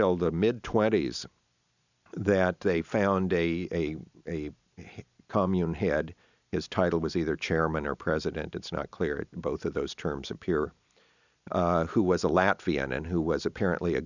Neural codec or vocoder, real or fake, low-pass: none; real; 7.2 kHz